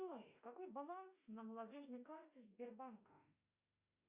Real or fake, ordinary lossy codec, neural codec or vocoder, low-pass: fake; Opus, 64 kbps; autoencoder, 48 kHz, 32 numbers a frame, DAC-VAE, trained on Japanese speech; 3.6 kHz